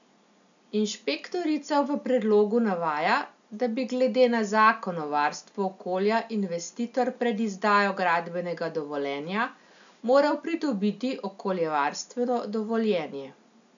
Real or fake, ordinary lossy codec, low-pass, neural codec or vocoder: real; MP3, 96 kbps; 7.2 kHz; none